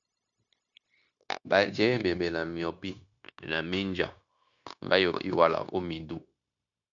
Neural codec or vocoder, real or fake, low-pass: codec, 16 kHz, 0.9 kbps, LongCat-Audio-Codec; fake; 7.2 kHz